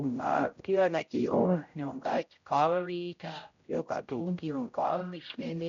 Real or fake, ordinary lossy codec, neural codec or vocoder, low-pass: fake; MP3, 48 kbps; codec, 16 kHz, 0.5 kbps, X-Codec, HuBERT features, trained on general audio; 7.2 kHz